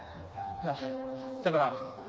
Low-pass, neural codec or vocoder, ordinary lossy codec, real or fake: none; codec, 16 kHz, 2 kbps, FreqCodec, smaller model; none; fake